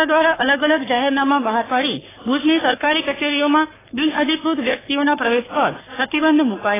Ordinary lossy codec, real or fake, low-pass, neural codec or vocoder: AAC, 16 kbps; fake; 3.6 kHz; codec, 44.1 kHz, 3.4 kbps, Pupu-Codec